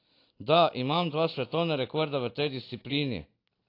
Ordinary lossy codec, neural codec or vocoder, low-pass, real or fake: AAC, 32 kbps; none; 5.4 kHz; real